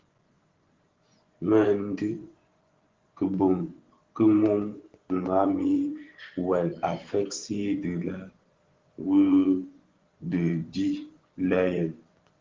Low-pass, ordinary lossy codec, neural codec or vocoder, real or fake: 7.2 kHz; Opus, 16 kbps; vocoder, 24 kHz, 100 mel bands, Vocos; fake